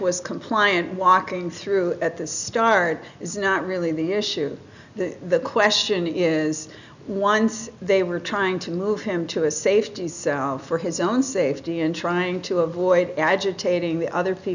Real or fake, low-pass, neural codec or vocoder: real; 7.2 kHz; none